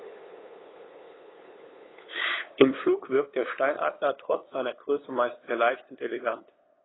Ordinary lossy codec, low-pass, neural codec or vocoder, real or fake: AAC, 16 kbps; 7.2 kHz; codec, 16 kHz, 8 kbps, FunCodec, trained on LibriTTS, 25 frames a second; fake